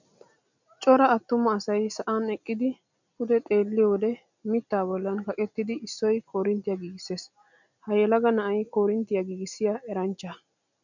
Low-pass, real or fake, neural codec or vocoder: 7.2 kHz; real; none